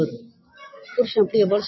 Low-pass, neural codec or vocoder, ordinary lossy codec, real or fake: 7.2 kHz; none; MP3, 24 kbps; real